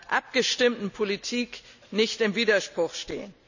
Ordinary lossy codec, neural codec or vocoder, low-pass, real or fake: none; none; 7.2 kHz; real